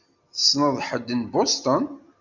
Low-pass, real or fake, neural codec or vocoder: 7.2 kHz; real; none